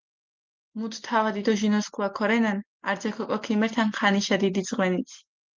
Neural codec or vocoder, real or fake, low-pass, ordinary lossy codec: none; real; 7.2 kHz; Opus, 24 kbps